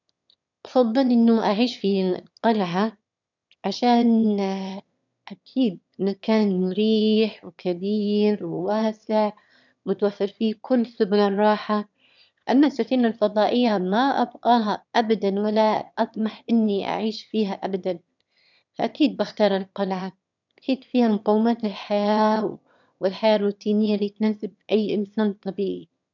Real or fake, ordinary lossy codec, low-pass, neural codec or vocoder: fake; none; 7.2 kHz; autoencoder, 22.05 kHz, a latent of 192 numbers a frame, VITS, trained on one speaker